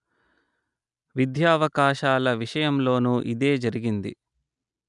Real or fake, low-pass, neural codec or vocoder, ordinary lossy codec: real; 10.8 kHz; none; none